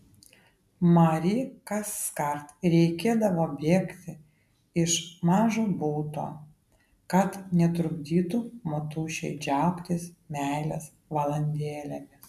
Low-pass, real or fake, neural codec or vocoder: 14.4 kHz; real; none